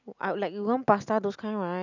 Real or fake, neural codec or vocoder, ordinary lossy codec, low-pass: real; none; none; 7.2 kHz